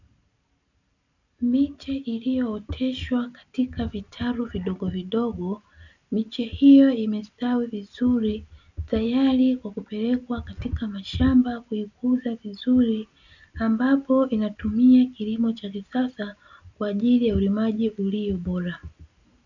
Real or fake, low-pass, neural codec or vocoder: real; 7.2 kHz; none